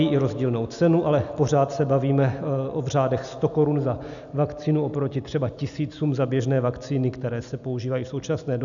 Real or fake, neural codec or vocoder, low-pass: real; none; 7.2 kHz